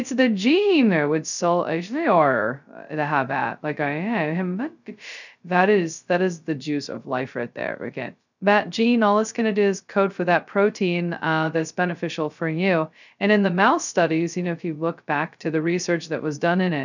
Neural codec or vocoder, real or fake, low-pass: codec, 16 kHz, 0.2 kbps, FocalCodec; fake; 7.2 kHz